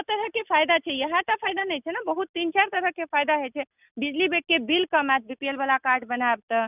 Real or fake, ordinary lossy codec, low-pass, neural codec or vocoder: real; none; 3.6 kHz; none